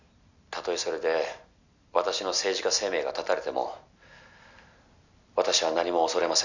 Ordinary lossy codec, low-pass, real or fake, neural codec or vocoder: none; 7.2 kHz; real; none